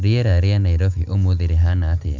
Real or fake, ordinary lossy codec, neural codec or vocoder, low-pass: real; none; none; 7.2 kHz